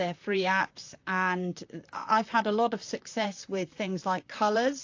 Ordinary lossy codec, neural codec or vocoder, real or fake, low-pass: AAC, 48 kbps; vocoder, 44.1 kHz, 128 mel bands, Pupu-Vocoder; fake; 7.2 kHz